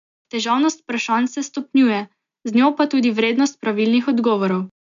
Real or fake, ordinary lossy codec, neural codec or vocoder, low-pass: real; AAC, 96 kbps; none; 7.2 kHz